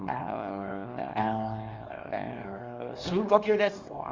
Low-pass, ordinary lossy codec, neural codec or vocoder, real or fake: 7.2 kHz; Opus, 32 kbps; codec, 24 kHz, 0.9 kbps, WavTokenizer, small release; fake